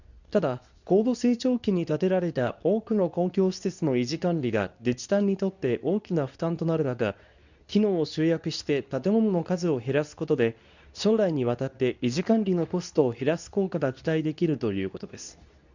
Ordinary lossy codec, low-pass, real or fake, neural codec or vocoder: none; 7.2 kHz; fake; codec, 24 kHz, 0.9 kbps, WavTokenizer, medium speech release version 2